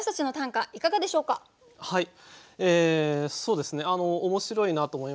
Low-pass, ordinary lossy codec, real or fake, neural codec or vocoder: none; none; real; none